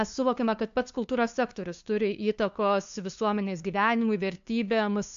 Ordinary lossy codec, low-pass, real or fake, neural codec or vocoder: AAC, 64 kbps; 7.2 kHz; fake; codec, 16 kHz, 2 kbps, FunCodec, trained on LibriTTS, 25 frames a second